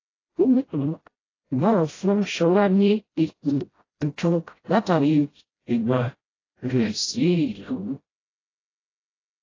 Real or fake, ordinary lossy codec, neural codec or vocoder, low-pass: fake; AAC, 32 kbps; codec, 16 kHz, 0.5 kbps, FreqCodec, smaller model; 7.2 kHz